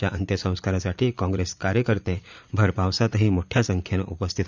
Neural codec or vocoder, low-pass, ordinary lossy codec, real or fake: vocoder, 44.1 kHz, 80 mel bands, Vocos; 7.2 kHz; none; fake